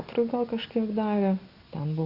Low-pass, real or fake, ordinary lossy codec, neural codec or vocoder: 5.4 kHz; real; Opus, 64 kbps; none